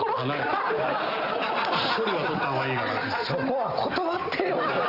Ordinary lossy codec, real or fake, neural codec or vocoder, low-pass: Opus, 32 kbps; real; none; 5.4 kHz